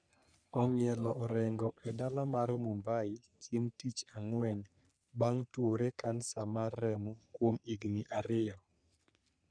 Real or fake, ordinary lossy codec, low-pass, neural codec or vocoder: fake; none; 9.9 kHz; codec, 44.1 kHz, 3.4 kbps, Pupu-Codec